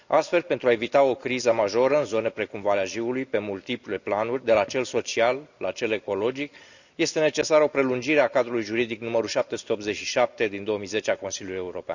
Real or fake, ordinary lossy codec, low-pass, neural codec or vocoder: real; none; 7.2 kHz; none